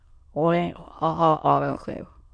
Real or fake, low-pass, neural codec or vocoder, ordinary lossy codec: fake; 9.9 kHz; autoencoder, 22.05 kHz, a latent of 192 numbers a frame, VITS, trained on many speakers; MP3, 48 kbps